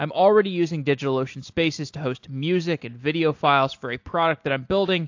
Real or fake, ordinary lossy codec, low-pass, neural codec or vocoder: real; AAC, 48 kbps; 7.2 kHz; none